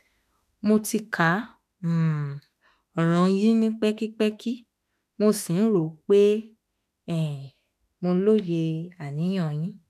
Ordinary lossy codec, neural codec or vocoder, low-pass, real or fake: none; autoencoder, 48 kHz, 32 numbers a frame, DAC-VAE, trained on Japanese speech; 14.4 kHz; fake